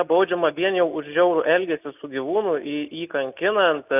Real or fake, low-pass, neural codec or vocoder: real; 3.6 kHz; none